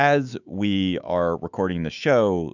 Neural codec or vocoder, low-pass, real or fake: autoencoder, 48 kHz, 128 numbers a frame, DAC-VAE, trained on Japanese speech; 7.2 kHz; fake